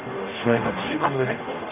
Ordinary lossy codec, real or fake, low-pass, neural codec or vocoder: none; fake; 3.6 kHz; codec, 44.1 kHz, 0.9 kbps, DAC